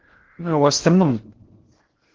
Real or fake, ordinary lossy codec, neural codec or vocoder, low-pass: fake; Opus, 16 kbps; codec, 16 kHz in and 24 kHz out, 0.6 kbps, FocalCodec, streaming, 4096 codes; 7.2 kHz